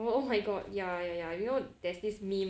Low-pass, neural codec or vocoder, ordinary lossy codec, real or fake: none; none; none; real